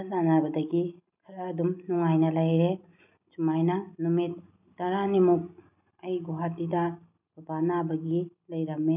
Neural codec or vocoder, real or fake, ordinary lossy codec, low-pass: none; real; none; 3.6 kHz